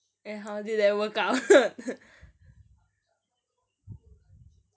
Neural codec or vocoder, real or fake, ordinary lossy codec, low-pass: none; real; none; none